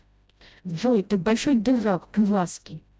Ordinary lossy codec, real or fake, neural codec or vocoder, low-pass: none; fake; codec, 16 kHz, 0.5 kbps, FreqCodec, smaller model; none